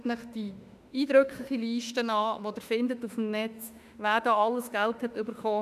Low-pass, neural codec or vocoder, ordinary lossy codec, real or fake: 14.4 kHz; autoencoder, 48 kHz, 32 numbers a frame, DAC-VAE, trained on Japanese speech; none; fake